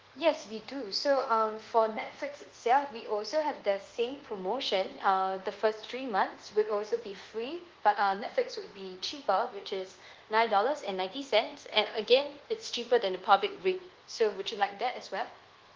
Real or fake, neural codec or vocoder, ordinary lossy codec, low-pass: fake; codec, 24 kHz, 1.2 kbps, DualCodec; Opus, 16 kbps; 7.2 kHz